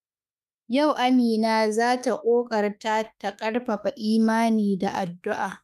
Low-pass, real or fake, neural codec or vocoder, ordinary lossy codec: 14.4 kHz; fake; autoencoder, 48 kHz, 32 numbers a frame, DAC-VAE, trained on Japanese speech; none